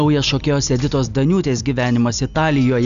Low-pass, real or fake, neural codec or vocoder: 7.2 kHz; real; none